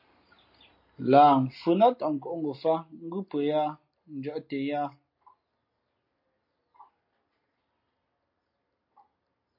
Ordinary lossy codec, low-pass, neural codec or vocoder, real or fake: MP3, 32 kbps; 5.4 kHz; none; real